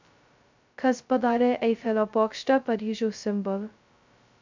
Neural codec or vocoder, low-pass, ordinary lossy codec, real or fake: codec, 16 kHz, 0.2 kbps, FocalCodec; 7.2 kHz; MP3, 64 kbps; fake